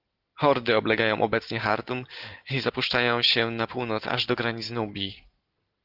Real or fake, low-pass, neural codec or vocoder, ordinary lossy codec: real; 5.4 kHz; none; Opus, 16 kbps